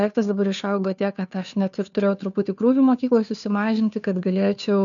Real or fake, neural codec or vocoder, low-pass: fake; codec, 16 kHz, 2 kbps, FunCodec, trained on Chinese and English, 25 frames a second; 7.2 kHz